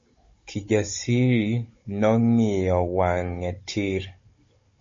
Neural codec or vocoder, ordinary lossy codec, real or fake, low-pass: codec, 16 kHz, 16 kbps, FunCodec, trained on Chinese and English, 50 frames a second; MP3, 32 kbps; fake; 7.2 kHz